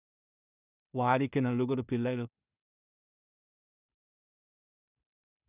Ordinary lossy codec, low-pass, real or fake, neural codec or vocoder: none; 3.6 kHz; fake; codec, 16 kHz in and 24 kHz out, 0.4 kbps, LongCat-Audio-Codec, two codebook decoder